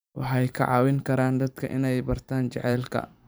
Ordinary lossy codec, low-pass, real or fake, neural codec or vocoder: none; none; fake; vocoder, 44.1 kHz, 128 mel bands every 512 samples, BigVGAN v2